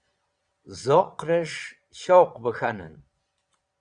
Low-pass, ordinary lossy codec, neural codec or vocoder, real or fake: 9.9 kHz; Opus, 64 kbps; vocoder, 22.05 kHz, 80 mel bands, Vocos; fake